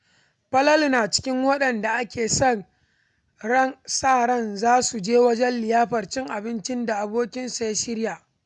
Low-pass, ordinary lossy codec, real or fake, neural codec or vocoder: 10.8 kHz; none; real; none